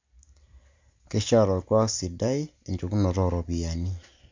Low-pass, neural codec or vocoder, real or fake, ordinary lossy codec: 7.2 kHz; none; real; MP3, 64 kbps